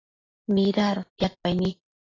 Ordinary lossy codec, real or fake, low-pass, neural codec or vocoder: AAC, 32 kbps; real; 7.2 kHz; none